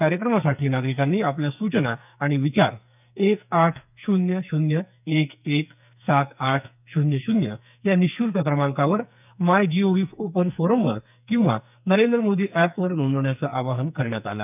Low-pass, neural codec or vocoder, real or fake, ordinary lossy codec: 3.6 kHz; codec, 44.1 kHz, 2.6 kbps, SNAC; fake; none